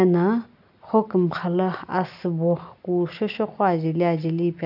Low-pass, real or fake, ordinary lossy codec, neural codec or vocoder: 5.4 kHz; real; AAC, 48 kbps; none